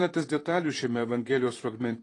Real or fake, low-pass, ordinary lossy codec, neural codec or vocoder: fake; 10.8 kHz; AAC, 32 kbps; vocoder, 44.1 kHz, 128 mel bands, Pupu-Vocoder